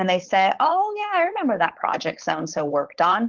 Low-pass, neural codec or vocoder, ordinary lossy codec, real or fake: 7.2 kHz; codec, 16 kHz, 4.8 kbps, FACodec; Opus, 16 kbps; fake